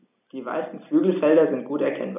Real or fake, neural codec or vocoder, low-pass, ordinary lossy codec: real; none; 3.6 kHz; none